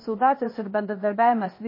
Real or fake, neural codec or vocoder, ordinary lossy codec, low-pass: fake; codec, 16 kHz, 0.8 kbps, ZipCodec; MP3, 24 kbps; 5.4 kHz